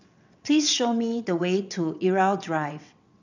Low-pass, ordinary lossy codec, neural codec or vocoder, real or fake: 7.2 kHz; none; vocoder, 22.05 kHz, 80 mel bands, WaveNeXt; fake